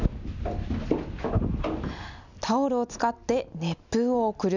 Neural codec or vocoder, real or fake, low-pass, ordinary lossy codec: none; real; 7.2 kHz; none